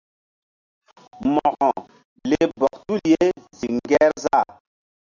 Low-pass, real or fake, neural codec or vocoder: 7.2 kHz; real; none